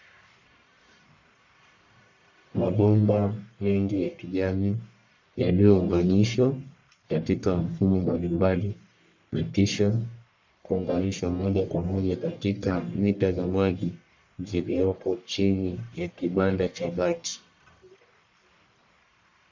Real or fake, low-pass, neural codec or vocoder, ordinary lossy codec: fake; 7.2 kHz; codec, 44.1 kHz, 1.7 kbps, Pupu-Codec; MP3, 64 kbps